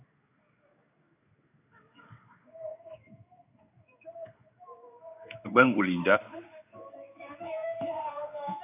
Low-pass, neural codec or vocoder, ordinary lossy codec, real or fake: 3.6 kHz; codec, 16 kHz in and 24 kHz out, 1 kbps, XY-Tokenizer; AAC, 24 kbps; fake